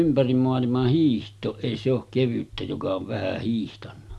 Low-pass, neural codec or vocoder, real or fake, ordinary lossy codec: none; none; real; none